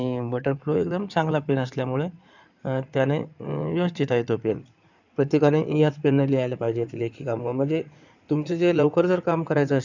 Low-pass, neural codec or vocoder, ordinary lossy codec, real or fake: 7.2 kHz; codec, 16 kHz in and 24 kHz out, 2.2 kbps, FireRedTTS-2 codec; none; fake